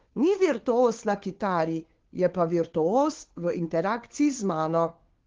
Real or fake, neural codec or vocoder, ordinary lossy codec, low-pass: fake; codec, 16 kHz, 2 kbps, FunCodec, trained on Chinese and English, 25 frames a second; Opus, 16 kbps; 7.2 kHz